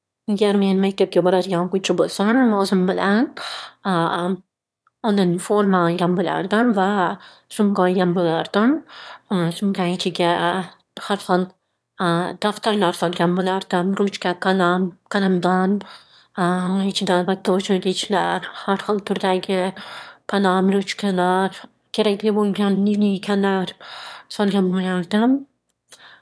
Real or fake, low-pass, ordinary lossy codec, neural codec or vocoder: fake; none; none; autoencoder, 22.05 kHz, a latent of 192 numbers a frame, VITS, trained on one speaker